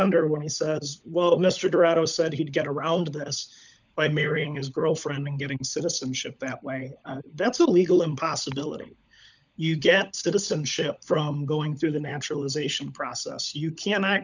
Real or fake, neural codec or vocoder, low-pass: fake; codec, 16 kHz, 16 kbps, FunCodec, trained on LibriTTS, 50 frames a second; 7.2 kHz